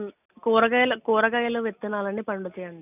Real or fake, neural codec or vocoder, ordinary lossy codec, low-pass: real; none; none; 3.6 kHz